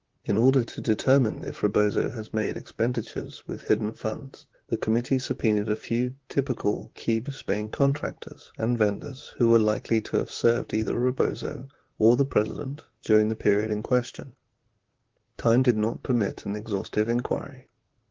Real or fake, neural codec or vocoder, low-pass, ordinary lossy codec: fake; vocoder, 44.1 kHz, 128 mel bands, Pupu-Vocoder; 7.2 kHz; Opus, 16 kbps